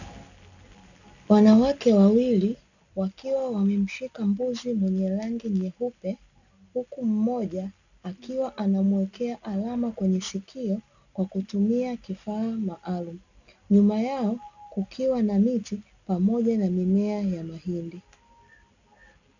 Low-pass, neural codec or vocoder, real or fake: 7.2 kHz; none; real